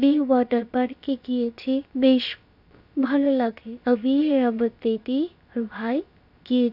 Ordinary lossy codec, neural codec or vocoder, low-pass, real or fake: none; codec, 16 kHz, about 1 kbps, DyCAST, with the encoder's durations; 5.4 kHz; fake